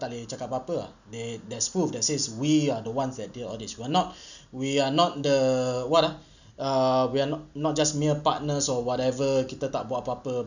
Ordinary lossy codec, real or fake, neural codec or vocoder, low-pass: none; real; none; 7.2 kHz